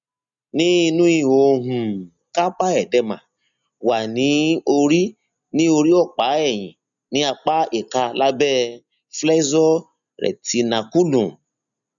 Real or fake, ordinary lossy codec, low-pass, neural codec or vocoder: real; none; 7.2 kHz; none